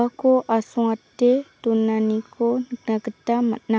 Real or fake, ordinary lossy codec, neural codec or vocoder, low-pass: real; none; none; none